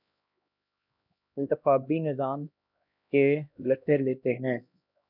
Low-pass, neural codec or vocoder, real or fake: 5.4 kHz; codec, 16 kHz, 1 kbps, X-Codec, HuBERT features, trained on LibriSpeech; fake